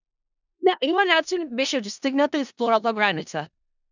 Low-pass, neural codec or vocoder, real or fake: 7.2 kHz; codec, 16 kHz in and 24 kHz out, 0.4 kbps, LongCat-Audio-Codec, four codebook decoder; fake